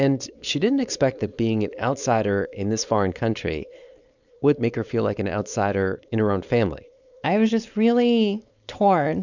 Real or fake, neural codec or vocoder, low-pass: fake; codec, 16 kHz, 4.8 kbps, FACodec; 7.2 kHz